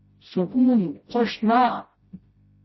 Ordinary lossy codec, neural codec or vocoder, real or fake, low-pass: MP3, 24 kbps; codec, 16 kHz, 0.5 kbps, FreqCodec, smaller model; fake; 7.2 kHz